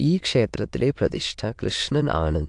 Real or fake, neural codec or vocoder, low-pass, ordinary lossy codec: fake; autoencoder, 22.05 kHz, a latent of 192 numbers a frame, VITS, trained on many speakers; 9.9 kHz; AAC, 64 kbps